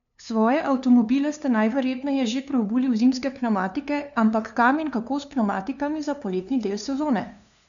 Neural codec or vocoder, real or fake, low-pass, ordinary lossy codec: codec, 16 kHz, 2 kbps, FunCodec, trained on LibriTTS, 25 frames a second; fake; 7.2 kHz; none